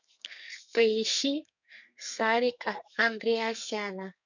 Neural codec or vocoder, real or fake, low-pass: codec, 32 kHz, 1.9 kbps, SNAC; fake; 7.2 kHz